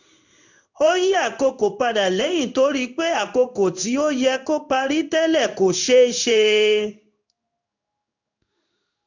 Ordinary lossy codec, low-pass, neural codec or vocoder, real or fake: none; 7.2 kHz; codec, 16 kHz in and 24 kHz out, 1 kbps, XY-Tokenizer; fake